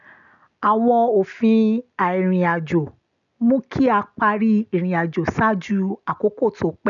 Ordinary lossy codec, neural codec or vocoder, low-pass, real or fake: none; none; 7.2 kHz; real